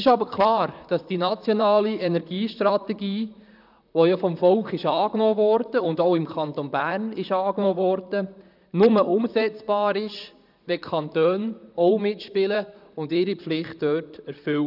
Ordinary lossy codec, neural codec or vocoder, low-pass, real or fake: none; vocoder, 44.1 kHz, 128 mel bands, Pupu-Vocoder; 5.4 kHz; fake